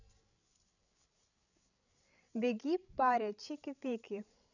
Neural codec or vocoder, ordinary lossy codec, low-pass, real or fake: codec, 16 kHz, 16 kbps, FreqCodec, larger model; none; 7.2 kHz; fake